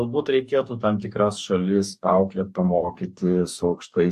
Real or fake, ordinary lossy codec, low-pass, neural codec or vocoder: fake; AAC, 96 kbps; 14.4 kHz; codec, 44.1 kHz, 2.6 kbps, DAC